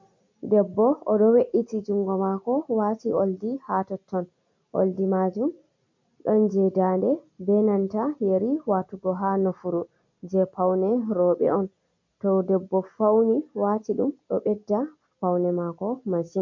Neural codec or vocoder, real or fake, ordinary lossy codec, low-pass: none; real; MP3, 48 kbps; 7.2 kHz